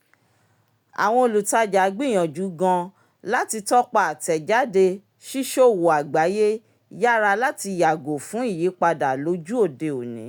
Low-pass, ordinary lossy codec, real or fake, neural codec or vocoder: none; none; real; none